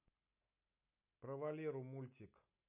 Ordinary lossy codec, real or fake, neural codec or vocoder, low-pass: none; real; none; 3.6 kHz